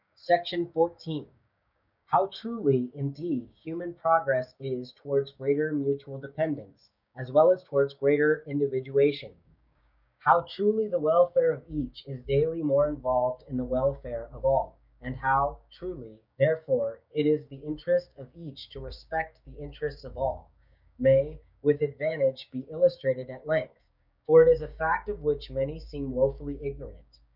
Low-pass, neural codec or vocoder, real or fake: 5.4 kHz; codec, 16 kHz, 6 kbps, DAC; fake